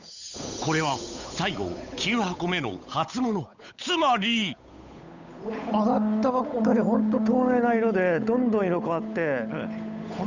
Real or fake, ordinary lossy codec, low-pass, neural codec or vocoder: fake; none; 7.2 kHz; codec, 16 kHz, 8 kbps, FunCodec, trained on Chinese and English, 25 frames a second